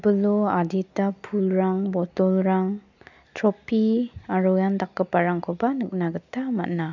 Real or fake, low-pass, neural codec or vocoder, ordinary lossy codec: real; 7.2 kHz; none; none